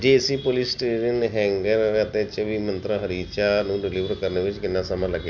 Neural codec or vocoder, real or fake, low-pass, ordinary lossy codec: none; real; 7.2 kHz; none